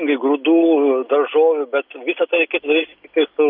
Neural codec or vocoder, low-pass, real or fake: none; 5.4 kHz; real